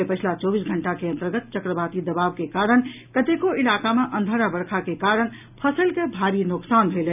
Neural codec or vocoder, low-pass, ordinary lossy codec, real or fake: none; 3.6 kHz; none; real